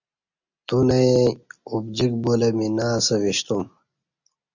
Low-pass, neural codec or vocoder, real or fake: 7.2 kHz; none; real